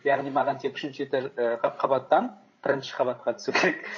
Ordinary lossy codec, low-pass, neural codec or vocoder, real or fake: MP3, 32 kbps; 7.2 kHz; codec, 16 kHz, 16 kbps, FreqCodec, larger model; fake